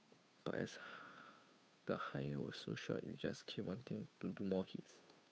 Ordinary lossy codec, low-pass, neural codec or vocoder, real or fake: none; none; codec, 16 kHz, 2 kbps, FunCodec, trained on Chinese and English, 25 frames a second; fake